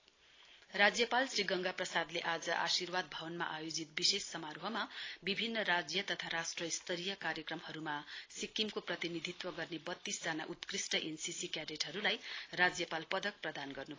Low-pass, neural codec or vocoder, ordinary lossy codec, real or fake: 7.2 kHz; none; AAC, 32 kbps; real